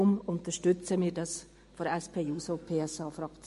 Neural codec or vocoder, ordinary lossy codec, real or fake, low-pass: none; MP3, 48 kbps; real; 14.4 kHz